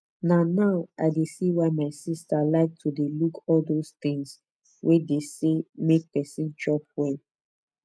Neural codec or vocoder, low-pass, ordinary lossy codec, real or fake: none; none; none; real